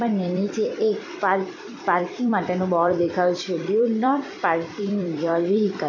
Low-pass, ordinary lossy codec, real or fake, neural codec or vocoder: 7.2 kHz; none; fake; vocoder, 44.1 kHz, 128 mel bands every 256 samples, BigVGAN v2